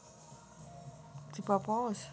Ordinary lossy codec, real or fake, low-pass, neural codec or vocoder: none; real; none; none